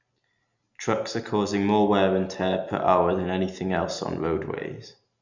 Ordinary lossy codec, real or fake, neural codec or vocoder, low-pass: none; real; none; 7.2 kHz